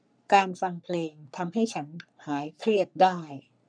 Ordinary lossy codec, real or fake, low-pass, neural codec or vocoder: none; fake; 9.9 kHz; codec, 44.1 kHz, 3.4 kbps, Pupu-Codec